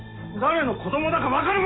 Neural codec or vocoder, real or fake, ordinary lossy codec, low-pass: none; real; AAC, 16 kbps; 7.2 kHz